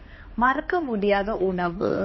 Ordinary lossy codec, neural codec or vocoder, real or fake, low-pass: MP3, 24 kbps; codec, 16 kHz, 2 kbps, X-Codec, HuBERT features, trained on balanced general audio; fake; 7.2 kHz